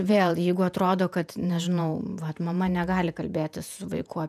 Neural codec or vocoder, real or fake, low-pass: vocoder, 48 kHz, 128 mel bands, Vocos; fake; 14.4 kHz